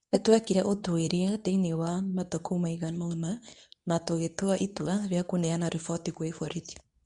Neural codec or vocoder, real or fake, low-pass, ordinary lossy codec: codec, 24 kHz, 0.9 kbps, WavTokenizer, medium speech release version 2; fake; 10.8 kHz; MP3, 64 kbps